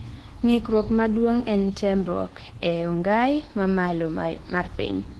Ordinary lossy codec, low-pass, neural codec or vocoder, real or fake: Opus, 16 kbps; 10.8 kHz; codec, 24 kHz, 1.2 kbps, DualCodec; fake